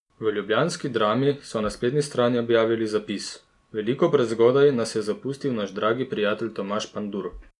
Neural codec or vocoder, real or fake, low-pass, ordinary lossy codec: none; real; 10.8 kHz; none